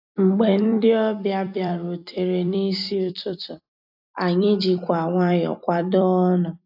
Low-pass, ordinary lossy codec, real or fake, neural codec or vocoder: 5.4 kHz; AAC, 48 kbps; fake; vocoder, 44.1 kHz, 128 mel bands every 256 samples, BigVGAN v2